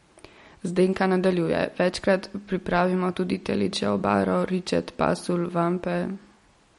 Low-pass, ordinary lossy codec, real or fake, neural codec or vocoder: 19.8 kHz; MP3, 48 kbps; real; none